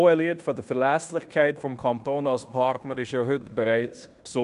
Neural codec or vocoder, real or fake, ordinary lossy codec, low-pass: codec, 16 kHz in and 24 kHz out, 0.9 kbps, LongCat-Audio-Codec, fine tuned four codebook decoder; fake; none; 10.8 kHz